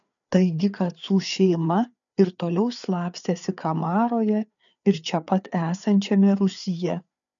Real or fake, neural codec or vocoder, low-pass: fake; codec, 16 kHz, 4 kbps, FreqCodec, larger model; 7.2 kHz